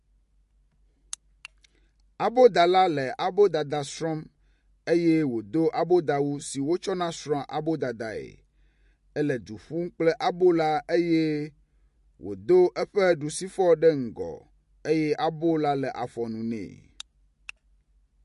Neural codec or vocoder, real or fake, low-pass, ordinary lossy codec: none; real; 14.4 kHz; MP3, 48 kbps